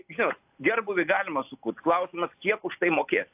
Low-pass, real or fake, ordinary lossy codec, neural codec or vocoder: 3.6 kHz; real; AAC, 32 kbps; none